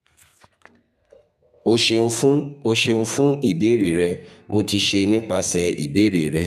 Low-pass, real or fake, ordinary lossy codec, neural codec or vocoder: 14.4 kHz; fake; none; codec, 32 kHz, 1.9 kbps, SNAC